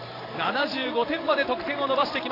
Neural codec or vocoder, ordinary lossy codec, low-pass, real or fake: vocoder, 44.1 kHz, 128 mel bands every 512 samples, BigVGAN v2; AAC, 24 kbps; 5.4 kHz; fake